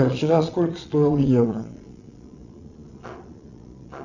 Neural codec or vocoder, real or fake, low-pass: vocoder, 22.05 kHz, 80 mel bands, Vocos; fake; 7.2 kHz